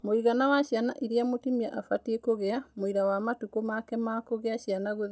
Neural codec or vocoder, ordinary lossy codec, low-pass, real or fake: none; none; none; real